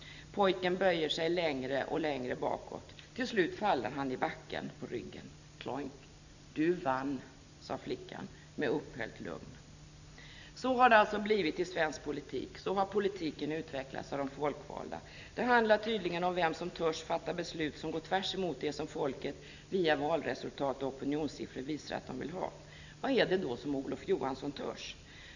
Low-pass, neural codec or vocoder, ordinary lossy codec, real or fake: 7.2 kHz; none; none; real